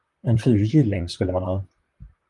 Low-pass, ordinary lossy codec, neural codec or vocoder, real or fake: 10.8 kHz; Opus, 32 kbps; vocoder, 44.1 kHz, 128 mel bands, Pupu-Vocoder; fake